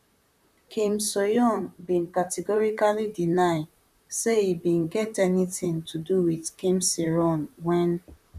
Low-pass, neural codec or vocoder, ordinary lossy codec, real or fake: 14.4 kHz; vocoder, 44.1 kHz, 128 mel bands, Pupu-Vocoder; none; fake